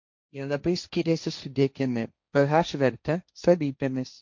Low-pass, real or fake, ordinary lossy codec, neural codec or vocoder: 7.2 kHz; fake; MP3, 48 kbps; codec, 16 kHz, 1.1 kbps, Voila-Tokenizer